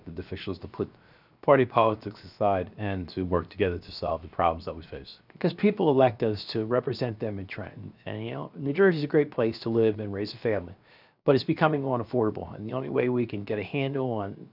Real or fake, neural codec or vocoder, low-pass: fake; codec, 16 kHz, 0.7 kbps, FocalCodec; 5.4 kHz